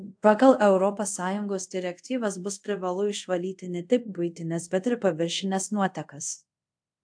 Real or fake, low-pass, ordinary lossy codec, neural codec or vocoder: fake; 9.9 kHz; AAC, 64 kbps; codec, 24 kHz, 0.5 kbps, DualCodec